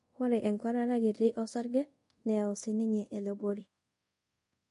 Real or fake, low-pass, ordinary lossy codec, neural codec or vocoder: fake; 10.8 kHz; MP3, 48 kbps; codec, 24 kHz, 0.5 kbps, DualCodec